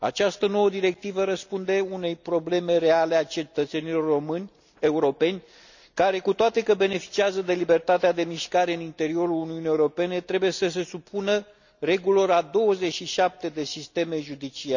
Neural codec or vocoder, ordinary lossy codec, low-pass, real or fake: none; none; 7.2 kHz; real